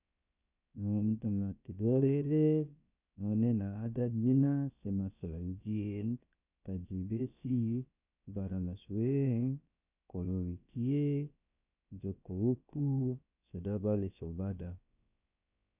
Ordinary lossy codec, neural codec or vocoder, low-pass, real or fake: none; codec, 16 kHz, 0.7 kbps, FocalCodec; 3.6 kHz; fake